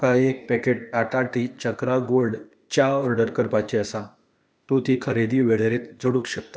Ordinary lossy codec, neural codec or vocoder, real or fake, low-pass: none; codec, 16 kHz, 0.8 kbps, ZipCodec; fake; none